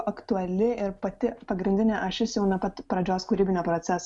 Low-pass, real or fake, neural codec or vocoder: 10.8 kHz; real; none